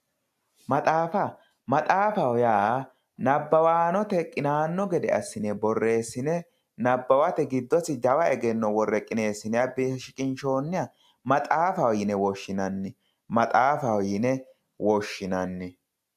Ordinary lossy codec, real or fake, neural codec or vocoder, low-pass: AAC, 96 kbps; real; none; 14.4 kHz